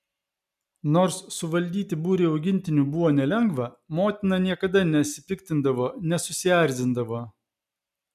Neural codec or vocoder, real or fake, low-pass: none; real; 14.4 kHz